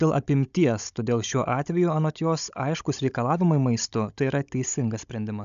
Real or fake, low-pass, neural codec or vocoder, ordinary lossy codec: fake; 7.2 kHz; codec, 16 kHz, 16 kbps, FunCodec, trained on Chinese and English, 50 frames a second; MP3, 96 kbps